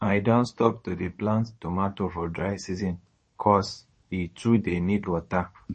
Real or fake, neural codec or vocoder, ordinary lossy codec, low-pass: fake; codec, 24 kHz, 0.9 kbps, WavTokenizer, medium speech release version 2; MP3, 32 kbps; 10.8 kHz